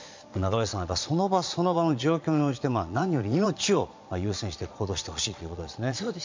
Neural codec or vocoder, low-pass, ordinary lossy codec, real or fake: vocoder, 22.05 kHz, 80 mel bands, Vocos; 7.2 kHz; MP3, 64 kbps; fake